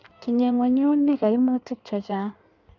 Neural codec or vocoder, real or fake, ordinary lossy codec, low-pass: codec, 16 kHz in and 24 kHz out, 1.1 kbps, FireRedTTS-2 codec; fake; none; 7.2 kHz